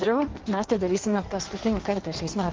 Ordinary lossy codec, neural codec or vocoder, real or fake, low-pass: Opus, 16 kbps; codec, 16 kHz in and 24 kHz out, 1.1 kbps, FireRedTTS-2 codec; fake; 7.2 kHz